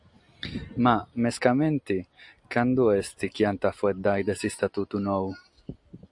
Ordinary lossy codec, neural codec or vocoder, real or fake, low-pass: AAC, 64 kbps; none; real; 10.8 kHz